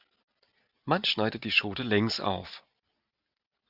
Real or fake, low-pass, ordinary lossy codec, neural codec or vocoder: real; 5.4 kHz; Opus, 64 kbps; none